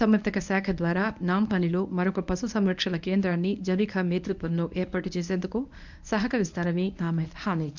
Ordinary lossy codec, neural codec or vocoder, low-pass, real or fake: none; codec, 24 kHz, 0.9 kbps, WavTokenizer, medium speech release version 1; 7.2 kHz; fake